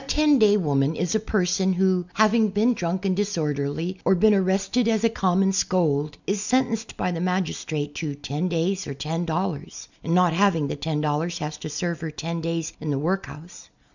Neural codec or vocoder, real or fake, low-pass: none; real; 7.2 kHz